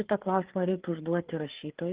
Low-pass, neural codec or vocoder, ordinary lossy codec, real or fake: 3.6 kHz; codec, 16 kHz, 4 kbps, FreqCodec, larger model; Opus, 16 kbps; fake